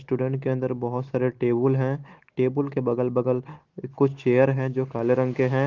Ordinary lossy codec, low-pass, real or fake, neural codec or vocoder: Opus, 16 kbps; 7.2 kHz; real; none